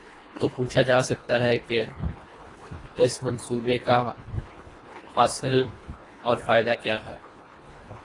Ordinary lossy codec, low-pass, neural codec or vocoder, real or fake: AAC, 32 kbps; 10.8 kHz; codec, 24 kHz, 1.5 kbps, HILCodec; fake